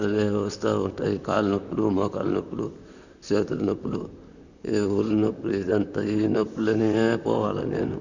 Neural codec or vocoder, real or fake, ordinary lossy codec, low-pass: vocoder, 44.1 kHz, 128 mel bands, Pupu-Vocoder; fake; none; 7.2 kHz